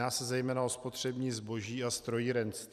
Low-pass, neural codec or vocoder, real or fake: 14.4 kHz; vocoder, 44.1 kHz, 128 mel bands every 256 samples, BigVGAN v2; fake